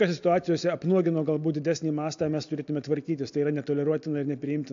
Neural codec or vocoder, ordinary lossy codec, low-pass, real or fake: none; AAC, 64 kbps; 7.2 kHz; real